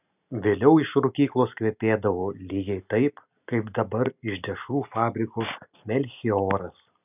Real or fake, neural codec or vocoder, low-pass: real; none; 3.6 kHz